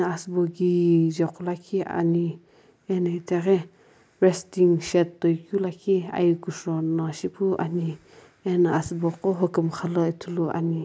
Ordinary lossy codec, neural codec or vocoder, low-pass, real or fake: none; none; none; real